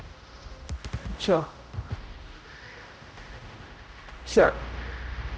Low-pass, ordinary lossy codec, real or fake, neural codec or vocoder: none; none; fake; codec, 16 kHz, 0.5 kbps, X-Codec, HuBERT features, trained on general audio